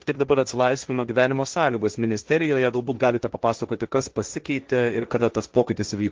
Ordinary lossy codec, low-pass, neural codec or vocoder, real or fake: Opus, 24 kbps; 7.2 kHz; codec, 16 kHz, 1.1 kbps, Voila-Tokenizer; fake